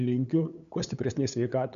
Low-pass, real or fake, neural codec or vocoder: 7.2 kHz; fake; codec, 16 kHz, 8 kbps, FunCodec, trained on LibriTTS, 25 frames a second